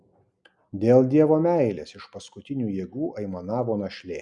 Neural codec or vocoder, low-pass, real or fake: none; 10.8 kHz; real